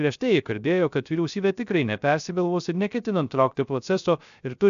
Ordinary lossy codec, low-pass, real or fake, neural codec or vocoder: AAC, 96 kbps; 7.2 kHz; fake; codec, 16 kHz, 0.3 kbps, FocalCodec